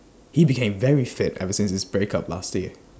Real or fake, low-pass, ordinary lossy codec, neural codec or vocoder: real; none; none; none